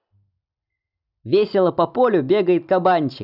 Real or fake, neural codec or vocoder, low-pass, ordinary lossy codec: real; none; 5.4 kHz; none